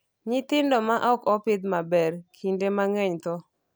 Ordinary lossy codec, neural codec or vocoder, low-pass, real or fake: none; none; none; real